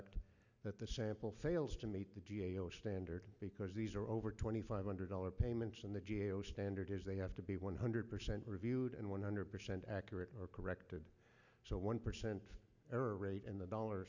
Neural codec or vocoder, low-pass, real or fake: none; 7.2 kHz; real